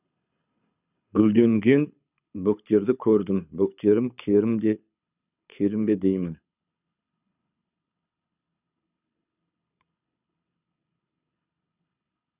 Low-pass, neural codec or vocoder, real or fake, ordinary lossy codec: 3.6 kHz; codec, 24 kHz, 6 kbps, HILCodec; fake; none